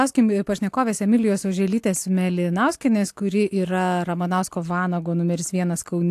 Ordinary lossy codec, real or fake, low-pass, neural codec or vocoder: AAC, 64 kbps; real; 14.4 kHz; none